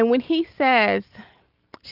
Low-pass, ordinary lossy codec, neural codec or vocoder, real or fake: 5.4 kHz; Opus, 32 kbps; none; real